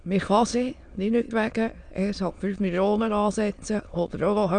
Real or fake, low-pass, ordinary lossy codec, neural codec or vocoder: fake; 9.9 kHz; none; autoencoder, 22.05 kHz, a latent of 192 numbers a frame, VITS, trained on many speakers